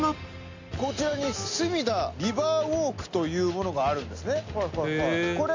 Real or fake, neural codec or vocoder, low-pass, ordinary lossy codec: real; none; 7.2 kHz; MP3, 64 kbps